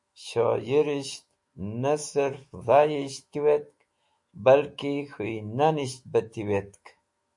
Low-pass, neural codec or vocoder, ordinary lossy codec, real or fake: 10.8 kHz; autoencoder, 48 kHz, 128 numbers a frame, DAC-VAE, trained on Japanese speech; MP3, 48 kbps; fake